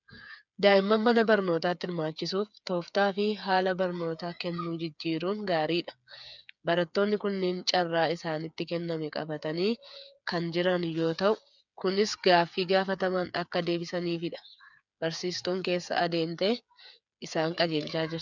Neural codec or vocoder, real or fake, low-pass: codec, 16 kHz, 8 kbps, FreqCodec, smaller model; fake; 7.2 kHz